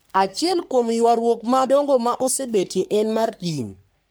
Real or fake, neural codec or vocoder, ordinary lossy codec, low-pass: fake; codec, 44.1 kHz, 3.4 kbps, Pupu-Codec; none; none